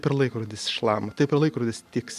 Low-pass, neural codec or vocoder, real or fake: 14.4 kHz; none; real